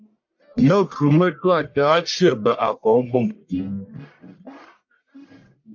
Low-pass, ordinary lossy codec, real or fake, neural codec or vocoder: 7.2 kHz; MP3, 48 kbps; fake; codec, 44.1 kHz, 1.7 kbps, Pupu-Codec